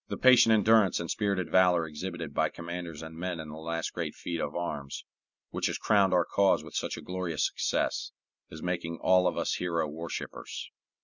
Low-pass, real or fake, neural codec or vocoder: 7.2 kHz; real; none